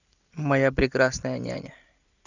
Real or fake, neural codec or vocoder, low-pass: real; none; 7.2 kHz